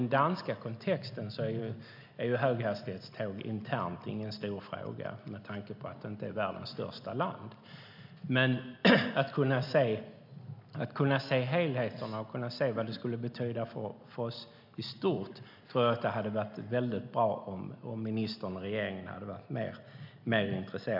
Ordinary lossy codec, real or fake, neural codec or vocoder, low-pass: MP3, 48 kbps; real; none; 5.4 kHz